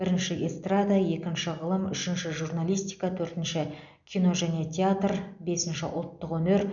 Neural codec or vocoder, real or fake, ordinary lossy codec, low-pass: none; real; none; 7.2 kHz